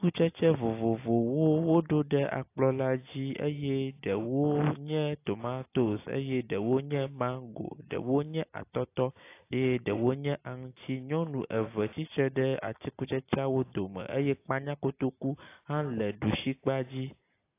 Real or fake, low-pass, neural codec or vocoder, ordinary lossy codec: real; 3.6 kHz; none; AAC, 24 kbps